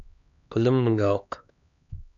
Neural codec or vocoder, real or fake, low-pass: codec, 16 kHz, 2 kbps, X-Codec, HuBERT features, trained on LibriSpeech; fake; 7.2 kHz